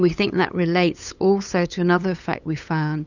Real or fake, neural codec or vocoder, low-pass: fake; codec, 16 kHz, 8 kbps, FunCodec, trained on LibriTTS, 25 frames a second; 7.2 kHz